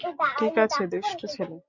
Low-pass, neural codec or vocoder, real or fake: 7.2 kHz; none; real